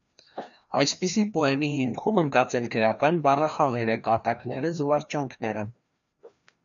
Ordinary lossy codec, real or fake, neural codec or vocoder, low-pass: MP3, 96 kbps; fake; codec, 16 kHz, 1 kbps, FreqCodec, larger model; 7.2 kHz